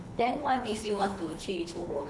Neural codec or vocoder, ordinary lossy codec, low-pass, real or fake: codec, 24 kHz, 3 kbps, HILCodec; none; none; fake